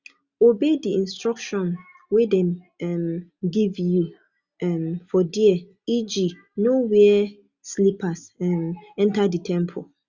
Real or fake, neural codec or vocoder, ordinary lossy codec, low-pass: real; none; Opus, 64 kbps; 7.2 kHz